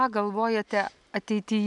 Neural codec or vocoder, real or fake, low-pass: none; real; 10.8 kHz